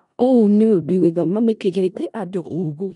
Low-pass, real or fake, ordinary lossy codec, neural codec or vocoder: 10.8 kHz; fake; none; codec, 16 kHz in and 24 kHz out, 0.4 kbps, LongCat-Audio-Codec, four codebook decoder